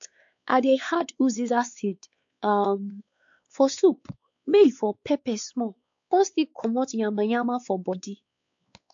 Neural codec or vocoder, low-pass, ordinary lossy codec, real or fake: codec, 16 kHz, 4 kbps, X-Codec, WavLM features, trained on Multilingual LibriSpeech; 7.2 kHz; AAC, 64 kbps; fake